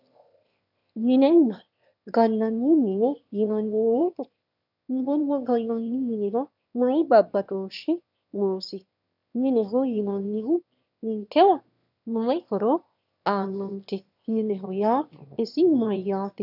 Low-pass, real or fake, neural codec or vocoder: 5.4 kHz; fake; autoencoder, 22.05 kHz, a latent of 192 numbers a frame, VITS, trained on one speaker